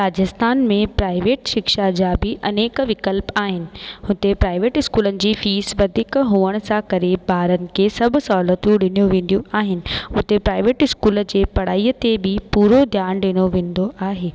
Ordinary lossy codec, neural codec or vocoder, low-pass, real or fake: none; none; none; real